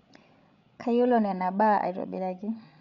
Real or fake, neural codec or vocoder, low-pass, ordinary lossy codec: fake; codec, 16 kHz, 16 kbps, FreqCodec, larger model; 7.2 kHz; none